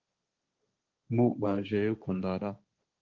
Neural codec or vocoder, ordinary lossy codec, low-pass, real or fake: codec, 16 kHz, 1.1 kbps, Voila-Tokenizer; Opus, 32 kbps; 7.2 kHz; fake